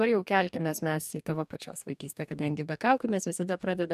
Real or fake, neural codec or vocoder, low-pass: fake; codec, 44.1 kHz, 2.6 kbps, DAC; 14.4 kHz